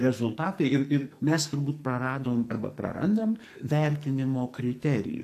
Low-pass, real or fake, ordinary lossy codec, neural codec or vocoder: 14.4 kHz; fake; AAC, 64 kbps; codec, 44.1 kHz, 2.6 kbps, SNAC